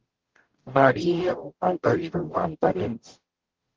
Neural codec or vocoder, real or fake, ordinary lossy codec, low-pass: codec, 44.1 kHz, 0.9 kbps, DAC; fake; Opus, 16 kbps; 7.2 kHz